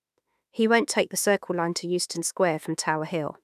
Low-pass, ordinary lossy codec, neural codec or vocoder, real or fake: 14.4 kHz; none; autoencoder, 48 kHz, 32 numbers a frame, DAC-VAE, trained on Japanese speech; fake